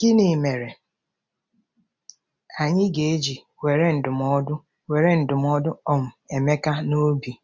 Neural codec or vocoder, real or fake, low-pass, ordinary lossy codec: none; real; none; none